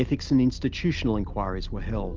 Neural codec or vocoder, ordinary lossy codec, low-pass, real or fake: none; Opus, 32 kbps; 7.2 kHz; real